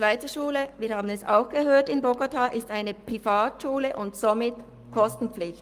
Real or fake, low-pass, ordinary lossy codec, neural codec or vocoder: fake; 14.4 kHz; Opus, 32 kbps; codec, 44.1 kHz, 7.8 kbps, Pupu-Codec